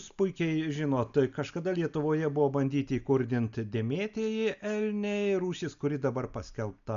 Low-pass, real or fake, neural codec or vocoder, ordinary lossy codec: 7.2 kHz; real; none; MP3, 96 kbps